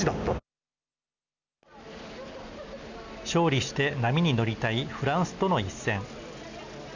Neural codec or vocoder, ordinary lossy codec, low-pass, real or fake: none; none; 7.2 kHz; real